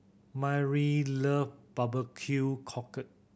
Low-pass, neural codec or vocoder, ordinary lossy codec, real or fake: none; none; none; real